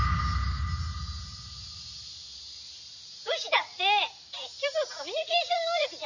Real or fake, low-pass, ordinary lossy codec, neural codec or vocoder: real; 7.2 kHz; none; none